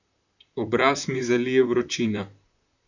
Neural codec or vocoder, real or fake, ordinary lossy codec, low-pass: vocoder, 44.1 kHz, 128 mel bands, Pupu-Vocoder; fake; none; 7.2 kHz